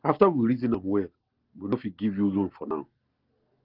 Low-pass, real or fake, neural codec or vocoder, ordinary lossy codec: 5.4 kHz; real; none; Opus, 16 kbps